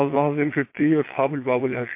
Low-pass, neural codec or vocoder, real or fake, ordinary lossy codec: 3.6 kHz; vocoder, 22.05 kHz, 80 mel bands, Vocos; fake; MP3, 24 kbps